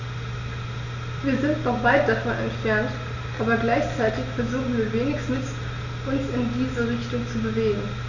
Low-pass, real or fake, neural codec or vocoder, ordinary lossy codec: 7.2 kHz; real; none; none